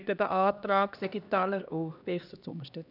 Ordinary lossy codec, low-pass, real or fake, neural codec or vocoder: none; 5.4 kHz; fake; codec, 16 kHz, 2 kbps, X-Codec, HuBERT features, trained on LibriSpeech